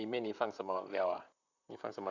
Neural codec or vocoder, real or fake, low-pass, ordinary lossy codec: vocoder, 44.1 kHz, 128 mel bands, Pupu-Vocoder; fake; 7.2 kHz; none